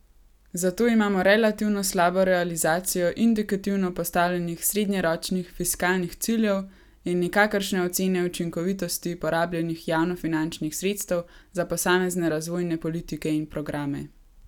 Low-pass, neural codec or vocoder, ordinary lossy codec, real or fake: 19.8 kHz; none; none; real